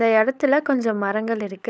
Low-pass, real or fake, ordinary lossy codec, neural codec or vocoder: none; fake; none; codec, 16 kHz, 16 kbps, FunCodec, trained on LibriTTS, 50 frames a second